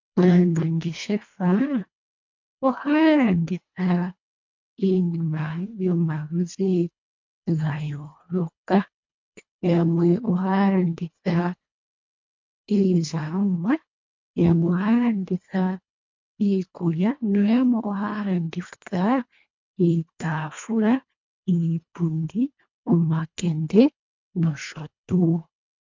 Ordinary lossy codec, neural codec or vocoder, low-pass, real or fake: MP3, 64 kbps; codec, 24 kHz, 1.5 kbps, HILCodec; 7.2 kHz; fake